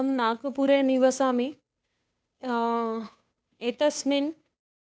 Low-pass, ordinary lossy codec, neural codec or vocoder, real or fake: none; none; codec, 16 kHz, 2 kbps, FunCodec, trained on Chinese and English, 25 frames a second; fake